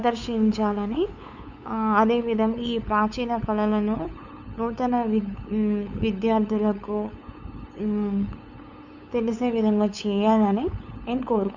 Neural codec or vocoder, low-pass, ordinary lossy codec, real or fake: codec, 16 kHz, 8 kbps, FunCodec, trained on LibriTTS, 25 frames a second; 7.2 kHz; none; fake